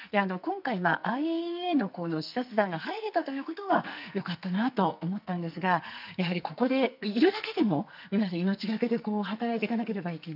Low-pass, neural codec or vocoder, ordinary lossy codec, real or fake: 5.4 kHz; codec, 44.1 kHz, 2.6 kbps, SNAC; none; fake